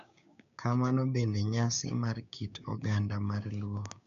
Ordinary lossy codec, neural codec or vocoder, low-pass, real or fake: none; codec, 16 kHz, 6 kbps, DAC; 7.2 kHz; fake